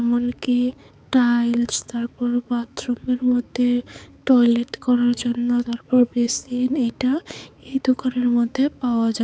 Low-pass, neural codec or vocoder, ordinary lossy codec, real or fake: none; codec, 16 kHz, 4 kbps, X-Codec, HuBERT features, trained on balanced general audio; none; fake